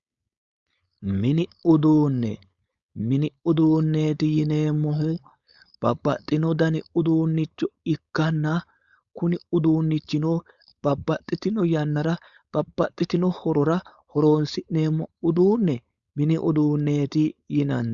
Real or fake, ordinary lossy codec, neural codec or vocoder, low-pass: fake; Opus, 64 kbps; codec, 16 kHz, 4.8 kbps, FACodec; 7.2 kHz